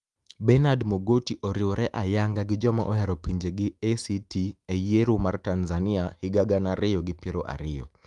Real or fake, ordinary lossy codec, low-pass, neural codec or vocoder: fake; Opus, 32 kbps; 10.8 kHz; autoencoder, 48 kHz, 128 numbers a frame, DAC-VAE, trained on Japanese speech